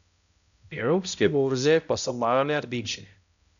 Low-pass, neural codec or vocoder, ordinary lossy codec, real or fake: 7.2 kHz; codec, 16 kHz, 0.5 kbps, X-Codec, HuBERT features, trained on balanced general audio; none; fake